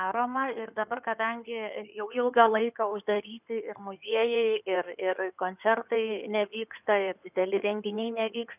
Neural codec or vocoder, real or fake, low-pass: codec, 16 kHz in and 24 kHz out, 2.2 kbps, FireRedTTS-2 codec; fake; 3.6 kHz